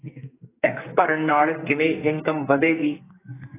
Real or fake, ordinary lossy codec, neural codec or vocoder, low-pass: fake; AAC, 16 kbps; codec, 44.1 kHz, 2.6 kbps, SNAC; 3.6 kHz